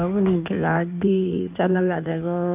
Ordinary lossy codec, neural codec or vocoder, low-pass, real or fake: none; codec, 16 kHz in and 24 kHz out, 1.1 kbps, FireRedTTS-2 codec; 3.6 kHz; fake